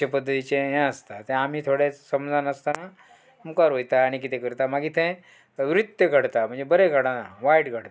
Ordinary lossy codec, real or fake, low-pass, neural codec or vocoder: none; real; none; none